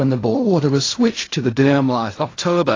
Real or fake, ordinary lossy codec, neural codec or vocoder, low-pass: fake; AAC, 32 kbps; codec, 16 kHz in and 24 kHz out, 0.4 kbps, LongCat-Audio-Codec, fine tuned four codebook decoder; 7.2 kHz